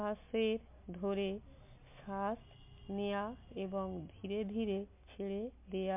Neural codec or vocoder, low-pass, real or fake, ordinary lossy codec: none; 3.6 kHz; real; MP3, 32 kbps